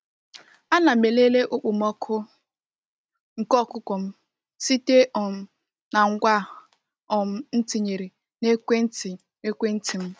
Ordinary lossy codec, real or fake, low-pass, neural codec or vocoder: none; real; none; none